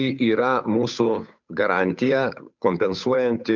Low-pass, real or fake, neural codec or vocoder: 7.2 kHz; fake; codec, 16 kHz, 8 kbps, FunCodec, trained on Chinese and English, 25 frames a second